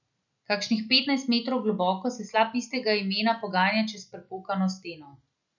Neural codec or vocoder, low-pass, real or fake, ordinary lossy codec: none; 7.2 kHz; real; none